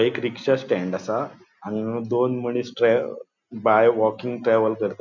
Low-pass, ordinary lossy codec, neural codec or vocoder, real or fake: 7.2 kHz; none; none; real